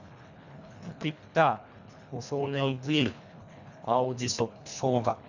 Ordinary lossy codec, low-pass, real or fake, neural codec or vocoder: none; 7.2 kHz; fake; codec, 24 kHz, 1.5 kbps, HILCodec